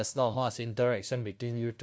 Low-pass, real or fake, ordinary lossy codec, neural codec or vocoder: none; fake; none; codec, 16 kHz, 0.5 kbps, FunCodec, trained on LibriTTS, 25 frames a second